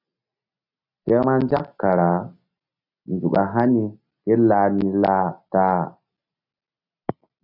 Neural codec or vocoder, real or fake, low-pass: none; real; 5.4 kHz